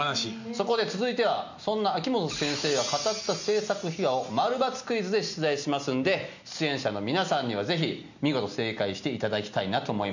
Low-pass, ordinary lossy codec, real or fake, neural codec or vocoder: 7.2 kHz; none; real; none